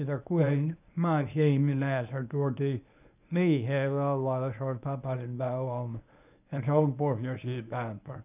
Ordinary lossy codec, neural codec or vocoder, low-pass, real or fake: none; codec, 24 kHz, 0.9 kbps, WavTokenizer, medium speech release version 1; 3.6 kHz; fake